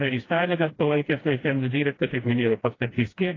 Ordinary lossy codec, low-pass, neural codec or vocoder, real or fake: AAC, 32 kbps; 7.2 kHz; codec, 16 kHz, 1 kbps, FreqCodec, smaller model; fake